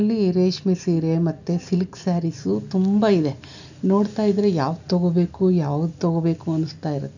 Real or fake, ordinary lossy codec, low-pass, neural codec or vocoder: real; none; 7.2 kHz; none